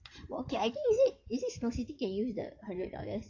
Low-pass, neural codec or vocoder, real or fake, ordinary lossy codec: 7.2 kHz; codec, 16 kHz in and 24 kHz out, 2.2 kbps, FireRedTTS-2 codec; fake; AAC, 48 kbps